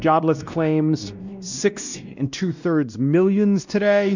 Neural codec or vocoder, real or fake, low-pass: codec, 16 kHz, 1 kbps, X-Codec, WavLM features, trained on Multilingual LibriSpeech; fake; 7.2 kHz